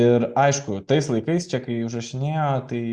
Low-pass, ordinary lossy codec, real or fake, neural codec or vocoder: 9.9 kHz; Opus, 64 kbps; real; none